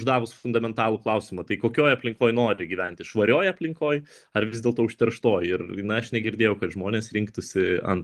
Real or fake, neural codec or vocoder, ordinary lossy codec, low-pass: real; none; Opus, 24 kbps; 14.4 kHz